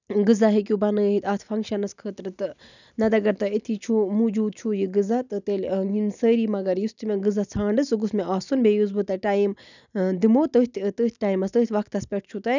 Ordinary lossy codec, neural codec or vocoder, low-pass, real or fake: none; none; 7.2 kHz; real